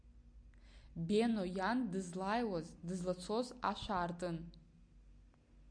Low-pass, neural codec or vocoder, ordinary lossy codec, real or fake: 9.9 kHz; none; AAC, 64 kbps; real